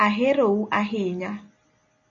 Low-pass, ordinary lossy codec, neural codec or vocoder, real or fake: 7.2 kHz; MP3, 32 kbps; none; real